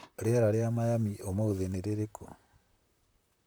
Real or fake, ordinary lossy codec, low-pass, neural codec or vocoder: fake; none; none; vocoder, 44.1 kHz, 128 mel bands, Pupu-Vocoder